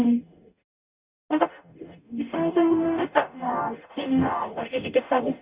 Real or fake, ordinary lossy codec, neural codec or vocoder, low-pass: fake; Opus, 64 kbps; codec, 44.1 kHz, 0.9 kbps, DAC; 3.6 kHz